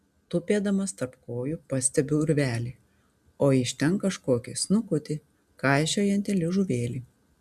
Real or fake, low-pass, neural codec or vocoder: real; 14.4 kHz; none